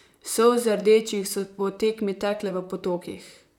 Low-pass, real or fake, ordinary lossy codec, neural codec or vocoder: 19.8 kHz; fake; none; vocoder, 44.1 kHz, 128 mel bands, Pupu-Vocoder